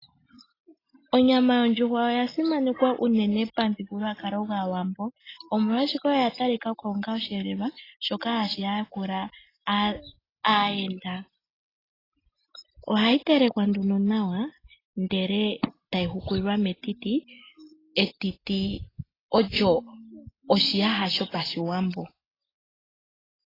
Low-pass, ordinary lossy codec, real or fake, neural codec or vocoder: 5.4 kHz; AAC, 24 kbps; real; none